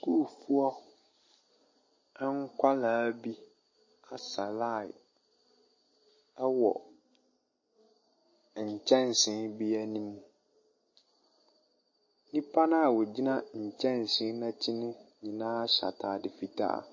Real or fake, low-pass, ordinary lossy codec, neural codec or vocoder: real; 7.2 kHz; MP3, 32 kbps; none